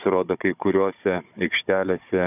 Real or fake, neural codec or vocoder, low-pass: fake; codec, 16 kHz, 16 kbps, FunCodec, trained on Chinese and English, 50 frames a second; 3.6 kHz